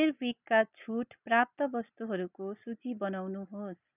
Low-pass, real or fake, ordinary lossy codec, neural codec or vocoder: 3.6 kHz; real; none; none